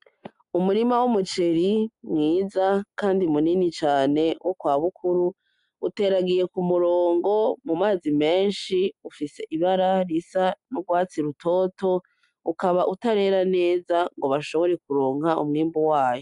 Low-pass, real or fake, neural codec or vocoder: 10.8 kHz; real; none